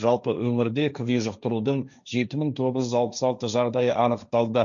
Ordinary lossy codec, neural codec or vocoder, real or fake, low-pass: none; codec, 16 kHz, 1.1 kbps, Voila-Tokenizer; fake; 7.2 kHz